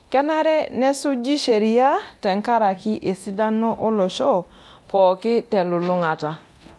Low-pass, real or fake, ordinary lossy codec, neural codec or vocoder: none; fake; none; codec, 24 kHz, 0.9 kbps, DualCodec